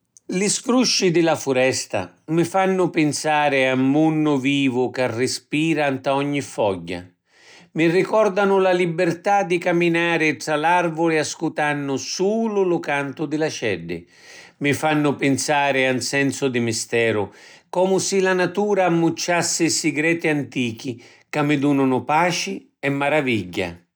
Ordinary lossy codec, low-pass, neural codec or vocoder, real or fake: none; none; none; real